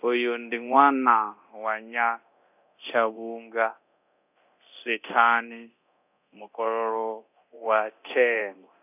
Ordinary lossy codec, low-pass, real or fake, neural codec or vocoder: none; 3.6 kHz; fake; codec, 24 kHz, 0.9 kbps, DualCodec